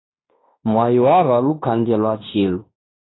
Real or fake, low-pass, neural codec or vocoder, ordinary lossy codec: fake; 7.2 kHz; codec, 16 kHz in and 24 kHz out, 0.9 kbps, LongCat-Audio-Codec, fine tuned four codebook decoder; AAC, 16 kbps